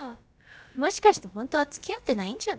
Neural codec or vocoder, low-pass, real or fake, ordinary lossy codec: codec, 16 kHz, about 1 kbps, DyCAST, with the encoder's durations; none; fake; none